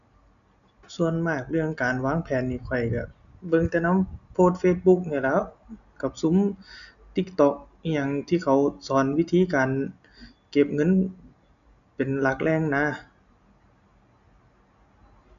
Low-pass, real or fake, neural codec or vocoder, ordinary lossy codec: 7.2 kHz; real; none; none